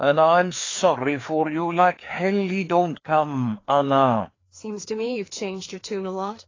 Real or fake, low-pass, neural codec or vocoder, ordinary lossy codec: fake; 7.2 kHz; codec, 16 kHz, 2 kbps, FreqCodec, larger model; AAC, 32 kbps